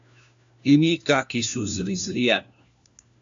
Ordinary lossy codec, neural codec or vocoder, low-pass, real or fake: AAC, 64 kbps; codec, 16 kHz, 1 kbps, FunCodec, trained on LibriTTS, 50 frames a second; 7.2 kHz; fake